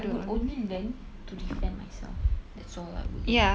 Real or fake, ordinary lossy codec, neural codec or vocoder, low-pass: real; none; none; none